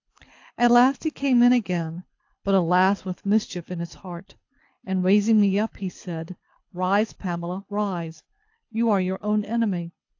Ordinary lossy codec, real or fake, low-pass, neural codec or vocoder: AAC, 48 kbps; fake; 7.2 kHz; codec, 24 kHz, 6 kbps, HILCodec